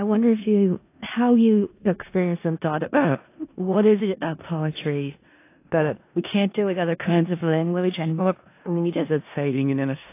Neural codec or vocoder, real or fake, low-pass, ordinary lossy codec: codec, 16 kHz in and 24 kHz out, 0.4 kbps, LongCat-Audio-Codec, four codebook decoder; fake; 3.6 kHz; AAC, 24 kbps